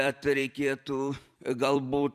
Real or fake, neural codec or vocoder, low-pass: fake; vocoder, 44.1 kHz, 128 mel bands every 256 samples, BigVGAN v2; 14.4 kHz